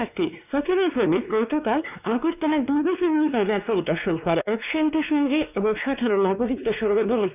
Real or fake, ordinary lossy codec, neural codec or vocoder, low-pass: fake; none; codec, 16 kHz, 2 kbps, X-Codec, WavLM features, trained on Multilingual LibriSpeech; 3.6 kHz